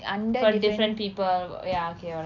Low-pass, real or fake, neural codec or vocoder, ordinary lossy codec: 7.2 kHz; real; none; none